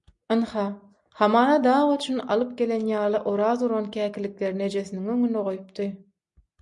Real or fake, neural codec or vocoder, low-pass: real; none; 10.8 kHz